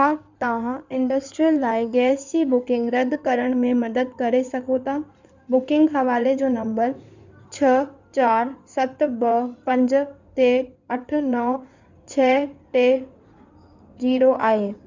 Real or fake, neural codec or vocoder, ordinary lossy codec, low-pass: fake; codec, 16 kHz in and 24 kHz out, 2.2 kbps, FireRedTTS-2 codec; none; 7.2 kHz